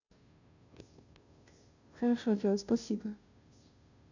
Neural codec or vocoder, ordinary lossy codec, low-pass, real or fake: codec, 16 kHz, 0.5 kbps, FunCodec, trained on Chinese and English, 25 frames a second; none; 7.2 kHz; fake